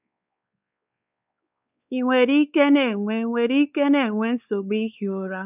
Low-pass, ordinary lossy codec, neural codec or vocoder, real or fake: 3.6 kHz; none; codec, 16 kHz, 4 kbps, X-Codec, WavLM features, trained on Multilingual LibriSpeech; fake